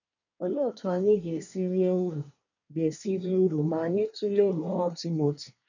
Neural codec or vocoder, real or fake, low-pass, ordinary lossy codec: codec, 24 kHz, 1 kbps, SNAC; fake; 7.2 kHz; none